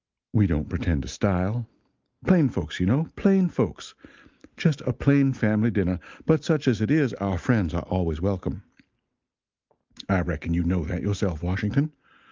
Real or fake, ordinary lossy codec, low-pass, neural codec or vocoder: real; Opus, 24 kbps; 7.2 kHz; none